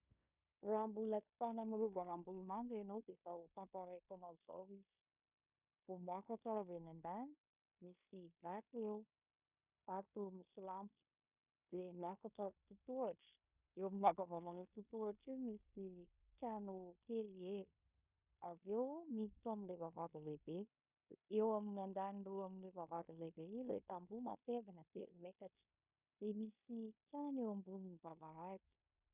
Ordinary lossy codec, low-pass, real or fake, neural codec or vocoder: Opus, 64 kbps; 3.6 kHz; fake; codec, 16 kHz in and 24 kHz out, 0.9 kbps, LongCat-Audio-Codec, fine tuned four codebook decoder